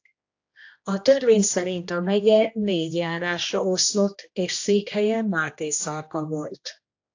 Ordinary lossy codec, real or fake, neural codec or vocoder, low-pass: AAC, 48 kbps; fake; codec, 16 kHz, 1 kbps, X-Codec, HuBERT features, trained on general audio; 7.2 kHz